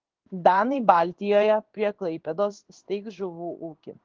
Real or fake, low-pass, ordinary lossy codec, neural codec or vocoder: fake; 7.2 kHz; Opus, 16 kbps; codec, 16 kHz in and 24 kHz out, 1 kbps, XY-Tokenizer